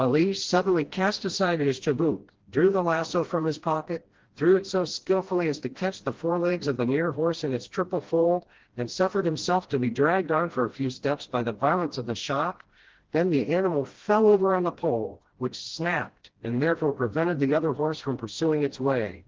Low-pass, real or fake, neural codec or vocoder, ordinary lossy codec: 7.2 kHz; fake; codec, 16 kHz, 1 kbps, FreqCodec, smaller model; Opus, 16 kbps